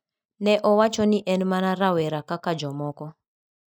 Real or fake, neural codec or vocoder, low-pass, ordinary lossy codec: real; none; none; none